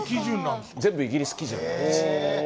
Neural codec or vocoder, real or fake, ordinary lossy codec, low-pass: none; real; none; none